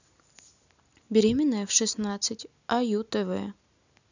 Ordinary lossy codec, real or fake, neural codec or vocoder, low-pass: none; real; none; 7.2 kHz